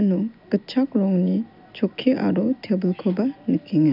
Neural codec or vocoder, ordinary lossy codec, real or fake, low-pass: none; none; real; 5.4 kHz